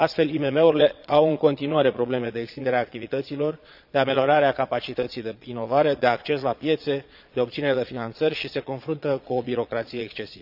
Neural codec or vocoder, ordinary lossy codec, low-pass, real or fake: vocoder, 22.05 kHz, 80 mel bands, Vocos; none; 5.4 kHz; fake